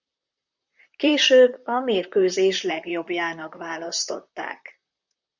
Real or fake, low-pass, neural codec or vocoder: fake; 7.2 kHz; vocoder, 44.1 kHz, 128 mel bands, Pupu-Vocoder